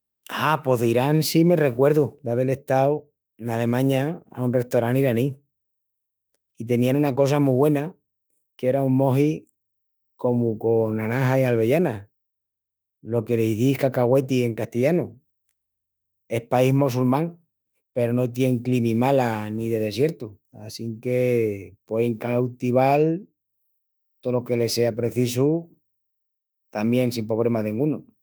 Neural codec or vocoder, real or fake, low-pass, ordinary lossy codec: autoencoder, 48 kHz, 32 numbers a frame, DAC-VAE, trained on Japanese speech; fake; none; none